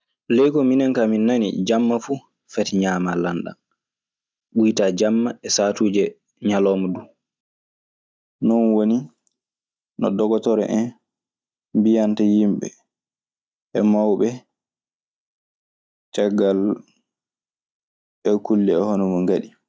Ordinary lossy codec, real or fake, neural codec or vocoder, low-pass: none; real; none; none